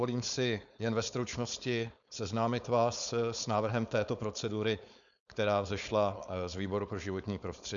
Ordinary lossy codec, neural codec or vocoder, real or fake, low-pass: MP3, 96 kbps; codec, 16 kHz, 4.8 kbps, FACodec; fake; 7.2 kHz